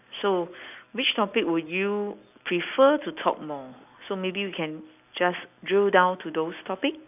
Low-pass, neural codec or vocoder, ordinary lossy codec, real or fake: 3.6 kHz; none; none; real